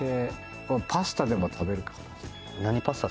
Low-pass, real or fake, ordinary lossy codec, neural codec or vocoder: none; real; none; none